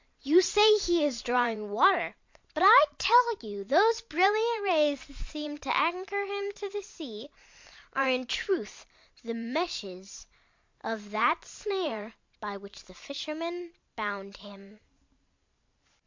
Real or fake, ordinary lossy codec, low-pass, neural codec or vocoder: fake; MP3, 48 kbps; 7.2 kHz; vocoder, 44.1 kHz, 128 mel bands every 512 samples, BigVGAN v2